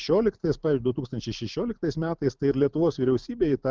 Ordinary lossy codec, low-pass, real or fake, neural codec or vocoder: Opus, 32 kbps; 7.2 kHz; real; none